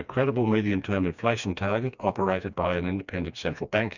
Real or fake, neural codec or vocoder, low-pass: fake; codec, 16 kHz, 2 kbps, FreqCodec, smaller model; 7.2 kHz